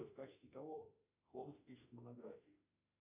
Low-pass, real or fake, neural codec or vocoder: 3.6 kHz; fake; autoencoder, 48 kHz, 32 numbers a frame, DAC-VAE, trained on Japanese speech